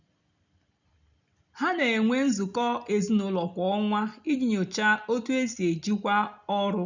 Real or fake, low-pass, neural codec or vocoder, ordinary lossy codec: real; 7.2 kHz; none; none